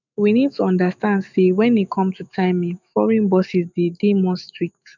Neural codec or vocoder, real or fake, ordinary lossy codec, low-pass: autoencoder, 48 kHz, 128 numbers a frame, DAC-VAE, trained on Japanese speech; fake; none; 7.2 kHz